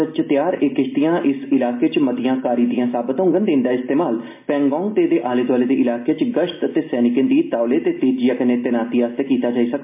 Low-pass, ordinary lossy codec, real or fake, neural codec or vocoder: 3.6 kHz; MP3, 24 kbps; real; none